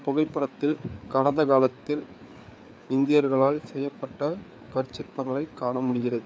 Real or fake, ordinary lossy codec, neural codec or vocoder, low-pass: fake; none; codec, 16 kHz, 4 kbps, FreqCodec, larger model; none